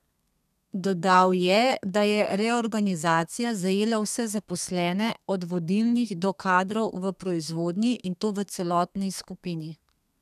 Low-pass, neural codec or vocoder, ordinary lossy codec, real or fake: 14.4 kHz; codec, 32 kHz, 1.9 kbps, SNAC; none; fake